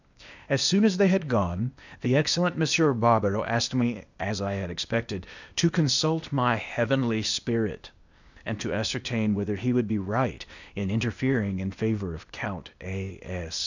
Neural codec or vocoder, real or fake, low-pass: codec, 16 kHz, 0.8 kbps, ZipCodec; fake; 7.2 kHz